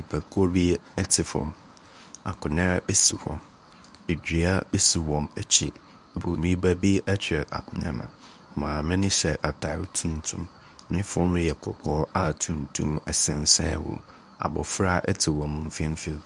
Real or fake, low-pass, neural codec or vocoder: fake; 10.8 kHz; codec, 24 kHz, 0.9 kbps, WavTokenizer, medium speech release version 1